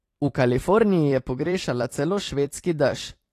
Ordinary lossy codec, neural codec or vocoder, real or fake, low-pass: AAC, 48 kbps; vocoder, 44.1 kHz, 128 mel bands every 256 samples, BigVGAN v2; fake; 14.4 kHz